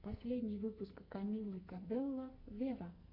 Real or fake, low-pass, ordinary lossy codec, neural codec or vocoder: fake; 5.4 kHz; MP3, 32 kbps; codec, 44.1 kHz, 2.6 kbps, SNAC